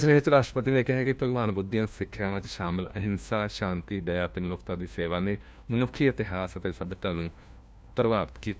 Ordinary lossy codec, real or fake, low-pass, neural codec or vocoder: none; fake; none; codec, 16 kHz, 1 kbps, FunCodec, trained on LibriTTS, 50 frames a second